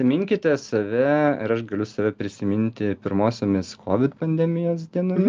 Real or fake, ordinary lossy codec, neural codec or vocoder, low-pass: real; Opus, 24 kbps; none; 7.2 kHz